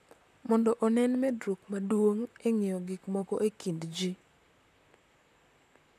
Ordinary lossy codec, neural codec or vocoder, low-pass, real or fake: none; vocoder, 44.1 kHz, 128 mel bands, Pupu-Vocoder; 14.4 kHz; fake